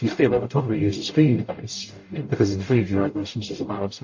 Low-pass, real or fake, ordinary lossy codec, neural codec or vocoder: 7.2 kHz; fake; MP3, 32 kbps; codec, 44.1 kHz, 0.9 kbps, DAC